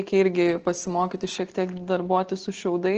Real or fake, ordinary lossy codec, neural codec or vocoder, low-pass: real; Opus, 16 kbps; none; 7.2 kHz